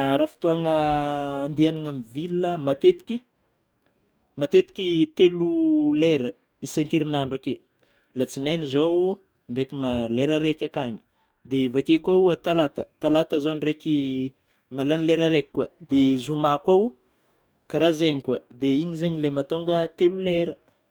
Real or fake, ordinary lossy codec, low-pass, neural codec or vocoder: fake; none; none; codec, 44.1 kHz, 2.6 kbps, DAC